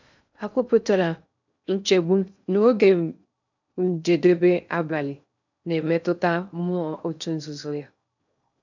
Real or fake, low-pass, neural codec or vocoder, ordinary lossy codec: fake; 7.2 kHz; codec, 16 kHz in and 24 kHz out, 0.6 kbps, FocalCodec, streaming, 2048 codes; none